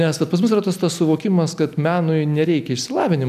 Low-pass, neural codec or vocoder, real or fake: 14.4 kHz; none; real